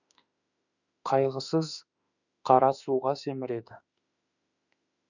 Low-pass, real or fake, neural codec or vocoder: 7.2 kHz; fake; autoencoder, 48 kHz, 32 numbers a frame, DAC-VAE, trained on Japanese speech